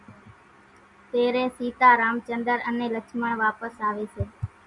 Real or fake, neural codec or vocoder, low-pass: real; none; 10.8 kHz